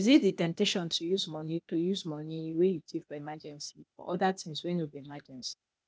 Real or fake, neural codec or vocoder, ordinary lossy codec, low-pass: fake; codec, 16 kHz, 0.8 kbps, ZipCodec; none; none